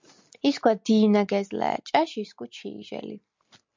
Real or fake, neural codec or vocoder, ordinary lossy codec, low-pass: real; none; MP3, 64 kbps; 7.2 kHz